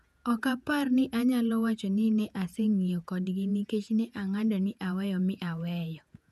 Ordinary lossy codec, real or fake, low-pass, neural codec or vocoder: none; fake; 14.4 kHz; vocoder, 48 kHz, 128 mel bands, Vocos